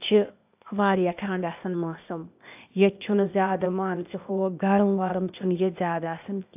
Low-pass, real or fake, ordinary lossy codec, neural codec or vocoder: 3.6 kHz; fake; AAC, 32 kbps; codec, 16 kHz, 0.8 kbps, ZipCodec